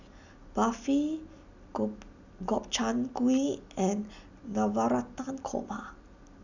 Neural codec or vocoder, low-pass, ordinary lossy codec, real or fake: none; 7.2 kHz; none; real